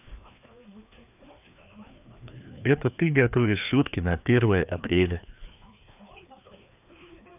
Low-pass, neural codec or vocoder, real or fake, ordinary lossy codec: 3.6 kHz; codec, 16 kHz, 2 kbps, FreqCodec, larger model; fake; none